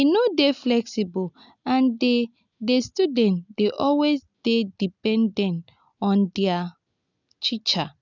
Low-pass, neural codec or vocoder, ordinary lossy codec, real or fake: 7.2 kHz; none; none; real